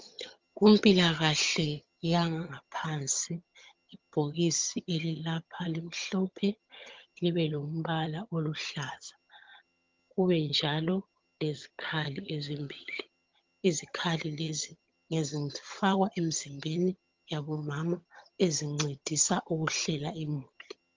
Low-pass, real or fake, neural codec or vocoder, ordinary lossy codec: 7.2 kHz; fake; vocoder, 22.05 kHz, 80 mel bands, HiFi-GAN; Opus, 32 kbps